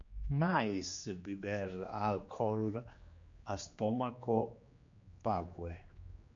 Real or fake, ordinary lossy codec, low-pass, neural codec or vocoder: fake; MP3, 48 kbps; 7.2 kHz; codec, 16 kHz, 2 kbps, X-Codec, HuBERT features, trained on general audio